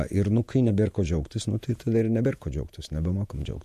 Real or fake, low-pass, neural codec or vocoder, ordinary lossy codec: fake; 14.4 kHz; vocoder, 48 kHz, 128 mel bands, Vocos; MP3, 64 kbps